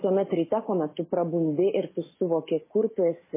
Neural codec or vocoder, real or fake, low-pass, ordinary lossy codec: none; real; 3.6 kHz; MP3, 16 kbps